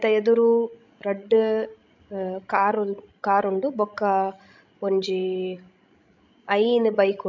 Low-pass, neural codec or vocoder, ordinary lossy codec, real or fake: 7.2 kHz; codec, 16 kHz, 16 kbps, FreqCodec, larger model; MP3, 64 kbps; fake